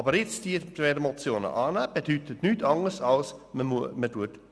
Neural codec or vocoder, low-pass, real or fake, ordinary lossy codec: none; none; real; none